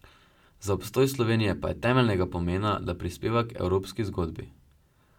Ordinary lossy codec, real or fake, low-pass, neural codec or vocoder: MP3, 96 kbps; real; 19.8 kHz; none